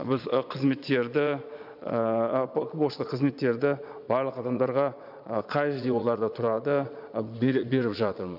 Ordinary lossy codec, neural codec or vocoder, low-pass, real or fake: none; vocoder, 22.05 kHz, 80 mel bands, Vocos; 5.4 kHz; fake